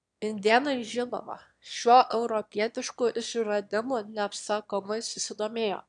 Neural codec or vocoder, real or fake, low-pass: autoencoder, 22.05 kHz, a latent of 192 numbers a frame, VITS, trained on one speaker; fake; 9.9 kHz